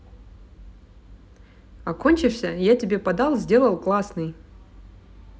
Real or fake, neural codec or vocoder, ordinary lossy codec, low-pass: real; none; none; none